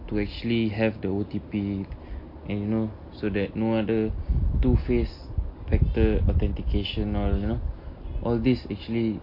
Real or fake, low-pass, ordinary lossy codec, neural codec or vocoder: real; 5.4 kHz; MP3, 32 kbps; none